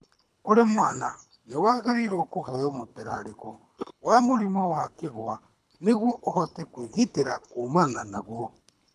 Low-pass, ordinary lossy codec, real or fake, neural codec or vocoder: none; none; fake; codec, 24 kHz, 3 kbps, HILCodec